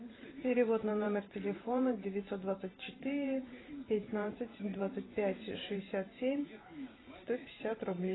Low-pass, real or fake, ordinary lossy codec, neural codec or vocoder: 7.2 kHz; fake; AAC, 16 kbps; vocoder, 44.1 kHz, 128 mel bands every 512 samples, BigVGAN v2